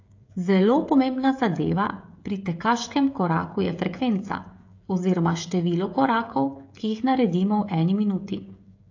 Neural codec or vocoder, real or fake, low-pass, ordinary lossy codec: codec, 16 kHz, 16 kbps, FreqCodec, smaller model; fake; 7.2 kHz; AAC, 48 kbps